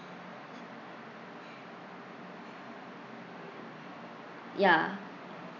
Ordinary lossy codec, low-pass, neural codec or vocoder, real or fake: none; 7.2 kHz; none; real